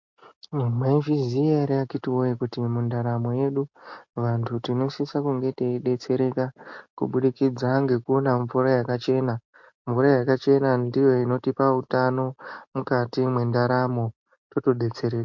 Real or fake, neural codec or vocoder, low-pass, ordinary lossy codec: real; none; 7.2 kHz; MP3, 48 kbps